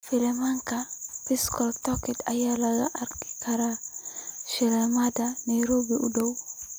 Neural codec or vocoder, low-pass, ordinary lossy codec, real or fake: none; none; none; real